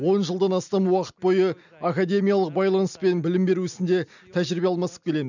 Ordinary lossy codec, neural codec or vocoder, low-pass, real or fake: none; none; 7.2 kHz; real